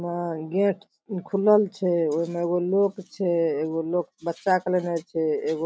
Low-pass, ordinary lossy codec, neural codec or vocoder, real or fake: none; none; none; real